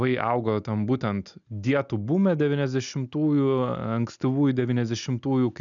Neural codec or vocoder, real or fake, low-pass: none; real; 7.2 kHz